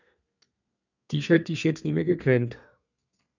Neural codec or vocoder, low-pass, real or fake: codec, 32 kHz, 1.9 kbps, SNAC; 7.2 kHz; fake